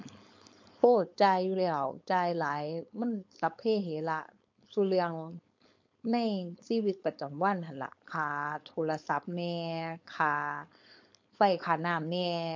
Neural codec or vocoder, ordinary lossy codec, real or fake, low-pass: codec, 16 kHz, 4.8 kbps, FACodec; MP3, 48 kbps; fake; 7.2 kHz